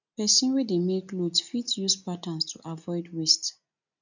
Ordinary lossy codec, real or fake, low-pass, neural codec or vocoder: none; real; 7.2 kHz; none